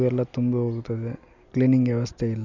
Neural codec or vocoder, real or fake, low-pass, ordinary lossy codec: none; real; 7.2 kHz; none